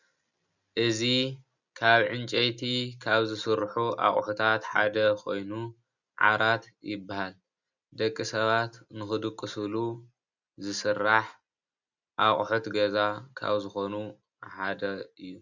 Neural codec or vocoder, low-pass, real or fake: none; 7.2 kHz; real